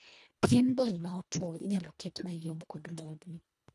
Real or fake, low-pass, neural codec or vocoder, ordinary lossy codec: fake; none; codec, 24 kHz, 1.5 kbps, HILCodec; none